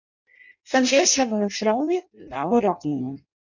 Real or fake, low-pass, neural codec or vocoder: fake; 7.2 kHz; codec, 16 kHz in and 24 kHz out, 0.6 kbps, FireRedTTS-2 codec